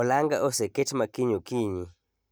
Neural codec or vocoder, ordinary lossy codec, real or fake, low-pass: none; none; real; none